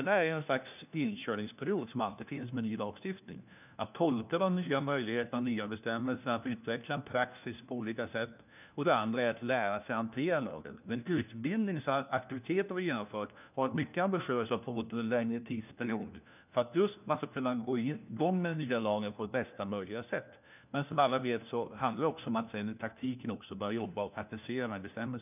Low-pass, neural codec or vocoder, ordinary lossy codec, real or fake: 3.6 kHz; codec, 16 kHz, 1 kbps, FunCodec, trained on LibriTTS, 50 frames a second; none; fake